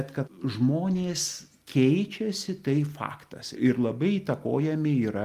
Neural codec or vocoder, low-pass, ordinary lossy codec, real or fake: none; 14.4 kHz; Opus, 32 kbps; real